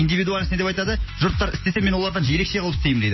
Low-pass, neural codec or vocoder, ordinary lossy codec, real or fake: 7.2 kHz; none; MP3, 24 kbps; real